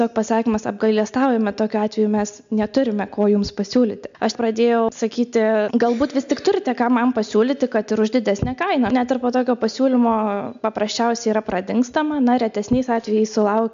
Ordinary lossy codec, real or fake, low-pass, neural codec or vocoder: MP3, 64 kbps; real; 7.2 kHz; none